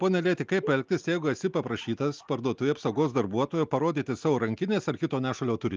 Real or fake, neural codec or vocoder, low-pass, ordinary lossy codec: real; none; 7.2 kHz; Opus, 24 kbps